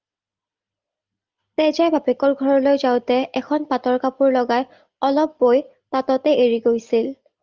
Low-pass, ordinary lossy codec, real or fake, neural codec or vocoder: 7.2 kHz; Opus, 32 kbps; real; none